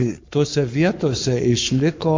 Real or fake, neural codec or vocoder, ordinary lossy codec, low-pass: fake; codec, 44.1 kHz, 7.8 kbps, Pupu-Codec; MP3, 48 kbps; 7.2 kHz